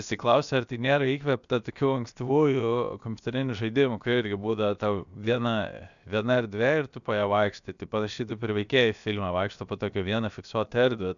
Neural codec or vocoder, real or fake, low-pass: codec, 16 kHz, 0.7 kbps, FocalCodec; fake; 7.2 kHz